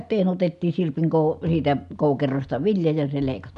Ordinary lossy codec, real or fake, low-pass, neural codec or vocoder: none; real; 10.8 kHz; none